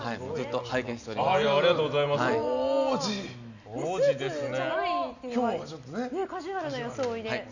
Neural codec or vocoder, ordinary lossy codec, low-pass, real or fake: none; none; 7.2 kHz; real